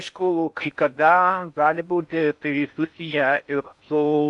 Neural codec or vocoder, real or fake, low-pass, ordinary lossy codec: codec, 16 kHz in and 24 kHz out, 0.6 kbps, FocalCodec, streaming, 4096 codes; fake; 10.8 kHz; MP3, 96 kbps